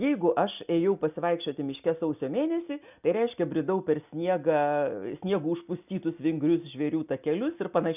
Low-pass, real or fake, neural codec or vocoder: 3.6 kHz; real; none